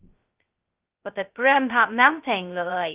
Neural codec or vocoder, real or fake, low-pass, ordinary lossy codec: codec, 16 kHz, 0.2 kbps, FocalCodec; fake; 3.6 kHz; Opus, 24 kbps